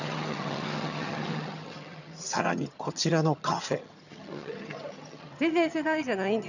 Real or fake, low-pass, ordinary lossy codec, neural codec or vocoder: fake; 7.2 kHz; none; vocoder, 22.05 kHz, 80 mel bands, HiFi-GAN